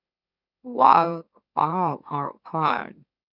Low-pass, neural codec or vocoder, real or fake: 5.4 kHz; autoencoder, 44.1 kHz, a latent of 192 numbers a frame, MeloTTS; fake